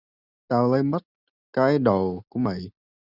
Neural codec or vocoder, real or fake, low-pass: none; real; 5.4 kHz